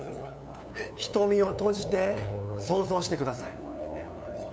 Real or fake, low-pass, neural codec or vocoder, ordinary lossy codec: fake; none; codec, 16 kHz, 2 kbps, FunCodec, trained on LibriTTS, 25 frames a second; none